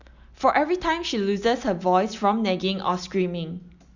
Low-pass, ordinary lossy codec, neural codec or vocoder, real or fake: 7.2 kHz; Opus, 64 kbps; none; real